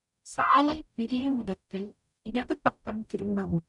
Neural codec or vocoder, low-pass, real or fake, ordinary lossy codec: codec, 44.1 kHz, 0.9 kbps, DAC; 10.8 kHz; fake; none